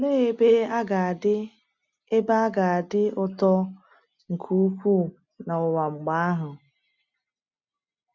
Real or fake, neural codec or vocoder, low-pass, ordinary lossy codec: real; none; 7.2 kHz; none